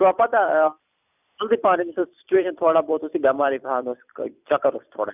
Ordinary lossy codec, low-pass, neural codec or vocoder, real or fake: none; 3.6 kHz; vocoder, 44.1 kHz, 128 mel bands every 256 samples, BigVGAN v2; fake